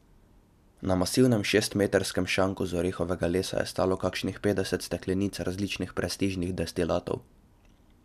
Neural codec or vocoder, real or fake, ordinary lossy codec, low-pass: none; real; MP3, 96 kbps; 14.4 kHz